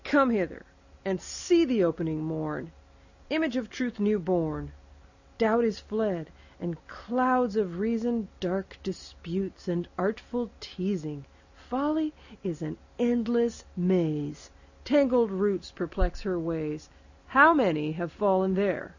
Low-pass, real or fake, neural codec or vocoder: 7.2 kHz; real; none